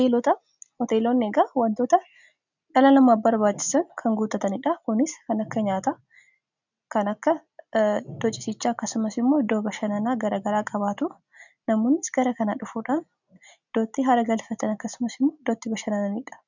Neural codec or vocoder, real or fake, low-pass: none; real; 7.2 kHz